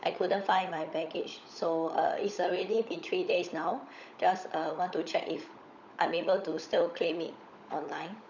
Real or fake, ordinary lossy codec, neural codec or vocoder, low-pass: fake; none; codec, 16 kHz, 16 kbps, FunCodec, trained on LibriTTS, 50 frames a second; 7.2 kHz